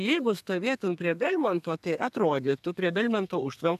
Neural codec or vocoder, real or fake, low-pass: codec, 32 kHz, 1.9 kbps, SNAC; fake; 14.4 kHz